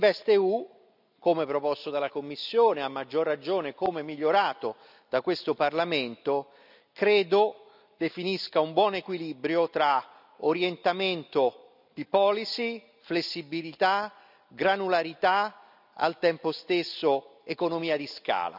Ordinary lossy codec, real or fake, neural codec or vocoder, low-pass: none; real; none; 5.4 kHz